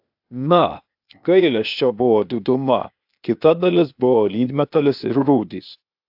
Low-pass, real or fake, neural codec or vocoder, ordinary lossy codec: 5.4 kHz; fake; codec, 16 kHz, 0.8 kbps, ZipCodec; AAC, 48 kbps